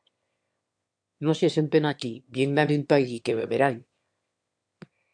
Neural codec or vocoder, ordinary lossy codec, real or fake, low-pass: autoencoder, 22.05 kHz, a latent of 192 numbers a frame, VITS, trained on one speaker; MP3, 64 kbps; fake; 9.9 kHz